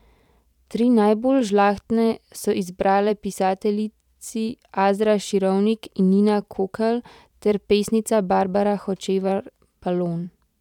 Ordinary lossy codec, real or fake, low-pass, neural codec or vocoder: none; fake; 19.8 kHz; vocoder, 44.1 kHz, 128 mel bands every 512 samples, BigVGAN v2